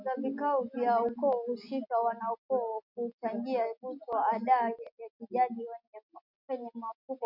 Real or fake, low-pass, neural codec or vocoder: real; 5.4 kHz; none